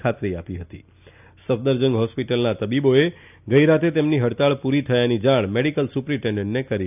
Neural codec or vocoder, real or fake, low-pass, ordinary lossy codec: none; real; 3.6 kHz; none